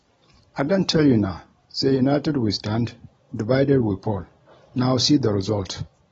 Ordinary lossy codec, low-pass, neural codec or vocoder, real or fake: AAC, 24 kbps; 19.8 kHz; none; real